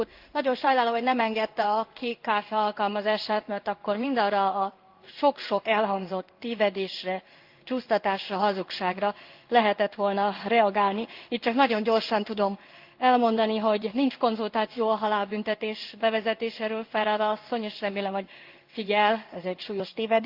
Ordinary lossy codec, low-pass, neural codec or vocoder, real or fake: Opus, 32 kbps; 5.4 kHz; codec, 16 kHz in and 24 kHz out, 1 kbps, XY-Tokenizer; fake